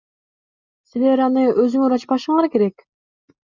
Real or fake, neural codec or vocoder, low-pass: real; none; 7.2 kHz